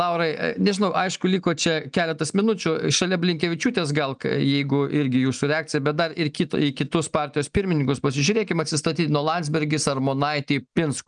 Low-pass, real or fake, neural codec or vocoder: 9.9 kHz; real; none